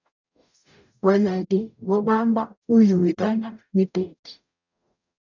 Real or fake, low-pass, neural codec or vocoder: fake; 7.2 kHz; codec, 44.1 kHz, 0.9 kbps, DAC